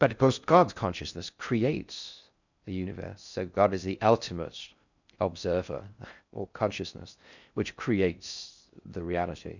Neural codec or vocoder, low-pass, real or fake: codec, 16 kHz in and 24 kHz out, 0.6 kbps, FocalCodec, streaming, 4096 codes; 7.2 kHz; fake